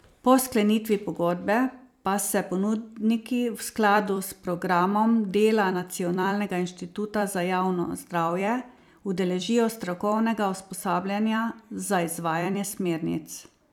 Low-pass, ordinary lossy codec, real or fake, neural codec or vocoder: 19.8 kHz; none; fake; vocoder, 44.1 kHz, 128 mel bands every 256 samples, BigVGAN v2